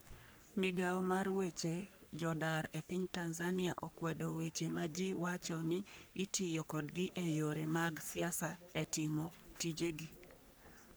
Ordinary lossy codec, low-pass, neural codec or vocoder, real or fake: none; none; codec, 44.1 kHz, 3.4 kbps, Pupu-Codec; fake